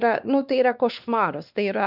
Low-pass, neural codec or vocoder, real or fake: 5.4 kHz; codec, 16 kHz, 2 kbps, X-Codec, WavLM features, trained on Multilingual LibriSpeech; fake